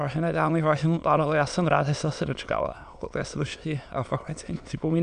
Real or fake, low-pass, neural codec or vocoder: fake; 9.9 kHz; autoencoder, 22.05 kHz, a latent of 192 numbers a frame, VITS, trained on many speakers